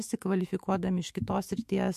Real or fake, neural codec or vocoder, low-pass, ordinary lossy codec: fake; autoencoder, 48 kHz, 128 numbers a frame, DAC-VAE, trained on Japanese speech; 14.4 kHz; MP3, 64 kbps